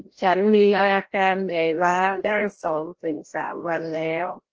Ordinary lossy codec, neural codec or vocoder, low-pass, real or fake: Opus, 16 kbps; codec, 16 kHz, 0.5 kbps, FreqCodec, larger model; 7.2 kHz; fake